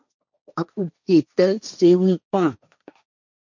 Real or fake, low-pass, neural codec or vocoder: fake; 7.2 kHz; codec, 16 kHz, 1.1 kbps, Voila-Tokenizer